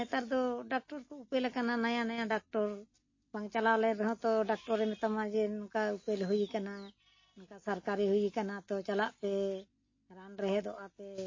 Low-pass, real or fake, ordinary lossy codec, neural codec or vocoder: 7.2 kHz; real; MP3, 32 kbps; none